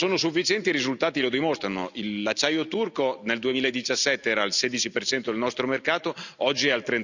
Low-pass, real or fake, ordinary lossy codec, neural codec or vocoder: 7.2 kHz; real; none; none